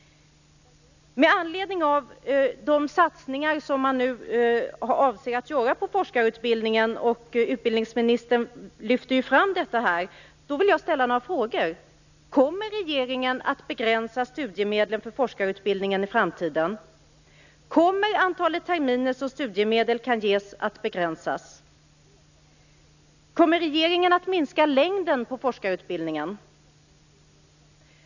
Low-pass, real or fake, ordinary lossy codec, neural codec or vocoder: 7.2 kHz; real; none; none